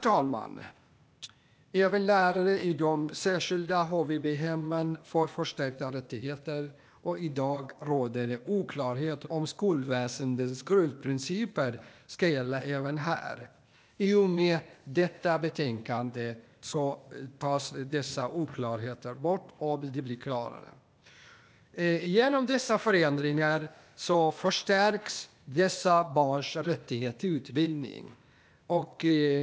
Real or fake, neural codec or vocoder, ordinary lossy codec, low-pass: fake; codec, 16 kHz, 0.8 kbps, ZipCodec; none; none